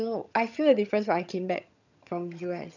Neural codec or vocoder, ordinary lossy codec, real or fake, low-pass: vocoder, 22.05 kHz, 80 mel bands, HiFi-GAN; none; fake; 7.2 kHz